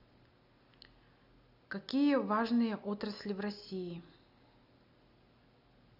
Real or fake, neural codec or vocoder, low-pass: real; none; 5.4 kHz